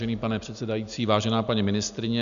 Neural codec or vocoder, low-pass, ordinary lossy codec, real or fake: none; 7.2 kHz; MP3, 96 kbps; real